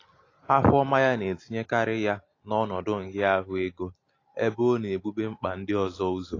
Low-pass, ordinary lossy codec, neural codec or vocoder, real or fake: 7.2 kHz; AAC, 32 kbps; none; real